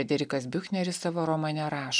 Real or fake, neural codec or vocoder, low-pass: real; none; 9.9 kHz